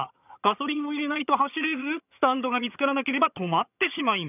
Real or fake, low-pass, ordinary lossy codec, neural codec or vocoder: fake; 3.6 kHz; none; vocoder, 22.05 kHz, 80 mel bands, HiFi-GAN